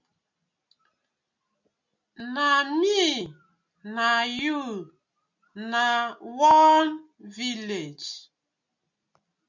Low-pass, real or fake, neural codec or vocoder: 7.2 kHz; real; none